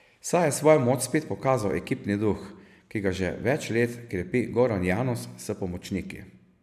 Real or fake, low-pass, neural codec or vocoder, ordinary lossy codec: real; 14.4 kHz; none; none